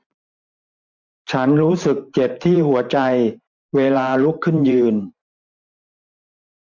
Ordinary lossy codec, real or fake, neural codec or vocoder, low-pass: MP3, 48 kbps; fake; vocoder, 44.1 kHz, 128 mel bands every 512 samples, BigVGAN v2; 7.2 kHz